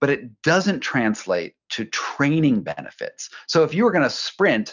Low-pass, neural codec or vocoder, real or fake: 7.2 kHz; none; real